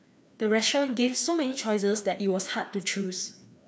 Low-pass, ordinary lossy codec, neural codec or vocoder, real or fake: none; none; codec, 16 kHz, 2 kbps, FreqCodec, larger model; fake